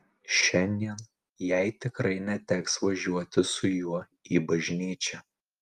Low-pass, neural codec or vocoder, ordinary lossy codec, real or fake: 14.4 kHz; none; Opus, 32 kbps; real